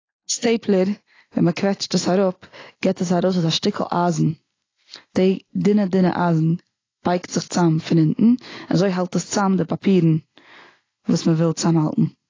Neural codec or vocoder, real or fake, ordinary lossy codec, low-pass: none; real; AAC, 32 kbps; 7.2 kHz